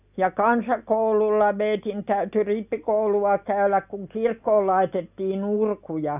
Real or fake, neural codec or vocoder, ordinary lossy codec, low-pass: real; none; none; 3.6 kHz